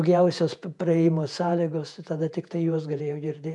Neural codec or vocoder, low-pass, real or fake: vocoder, 48 kHz, 128 mel bands, Vocos; 10.8 kHz; fake